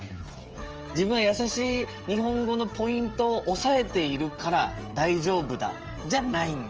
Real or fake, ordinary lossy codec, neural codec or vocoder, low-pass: fake; Opus, 24 kbps; codec, 16 kHz, 16 kbps, FreqCodec, smaller model; 7.2 kHz